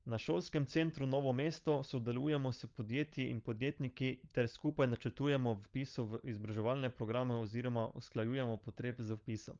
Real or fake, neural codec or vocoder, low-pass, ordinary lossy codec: fake; codec, 16 kHz, 16 kbps, FunCodec, trained on LibriTTS, 50 frames a second; 7.2 kHz; Opus, 24 kbps